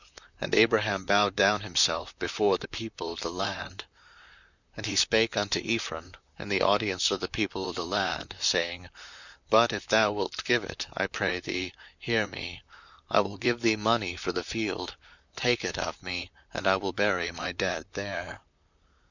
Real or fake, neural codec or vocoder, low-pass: fake; vocoder, 44.1 kHz, 128 mel bands, Pupu-Vocoder; 7.2 kHz